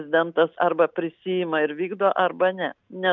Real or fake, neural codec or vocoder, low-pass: real; none; 7.2 kHz